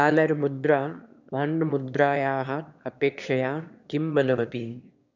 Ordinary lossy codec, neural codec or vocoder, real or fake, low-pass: none; autoencoder, 22.05 kHz, a latent of 192 numbers a frame, VITS, trained on one speaker; fake; 7.2 kHz